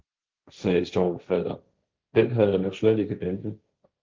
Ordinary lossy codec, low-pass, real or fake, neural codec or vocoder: Opus, 24 kbps; 7.2 kHz; fake; codec, 16 kHz, 1.1 kbps, Voila-Tokenizer